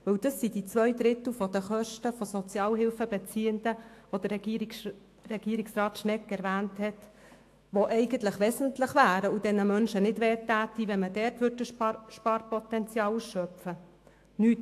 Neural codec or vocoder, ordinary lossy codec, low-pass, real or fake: autoencoder, 48 kHz, 128 numbers a frame, DAC-VAE, trained on Japanese speech; AAC, 64 kbps; 14.4 kHz; fake